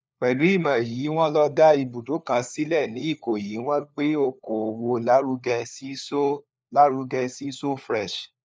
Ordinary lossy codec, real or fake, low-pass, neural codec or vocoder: none; fake; none; codec, 16 kHz, 4 kbps, FunCodec, trained on LibriTTS, 50 frames a second